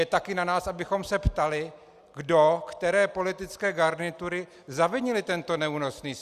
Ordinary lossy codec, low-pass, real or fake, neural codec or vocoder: Opus, 64 kbps; 14.4 kHz; real; none